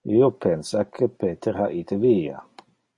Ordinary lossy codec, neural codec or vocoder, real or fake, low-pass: MP3, 48 kbps; none; real; 10.8 kHz